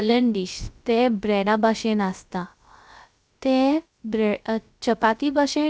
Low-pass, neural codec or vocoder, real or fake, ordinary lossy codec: none; codec, 16 kHz, 0.3 kbps, FocalCodec; fake; none